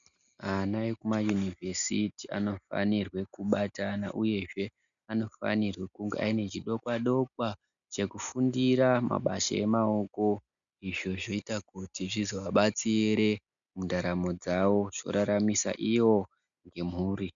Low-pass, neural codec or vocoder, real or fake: 7.2 kHz; none; real